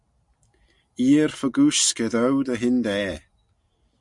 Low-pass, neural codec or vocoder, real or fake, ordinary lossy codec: 10.8 kHz; none; real; MP3, 96 kbps